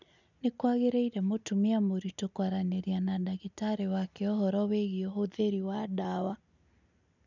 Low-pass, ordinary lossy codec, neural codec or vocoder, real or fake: 7.2 kHz; none; none; real